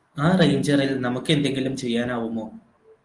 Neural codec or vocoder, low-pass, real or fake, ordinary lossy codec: none; 10.8 kHz; real; Opus, 24 kbps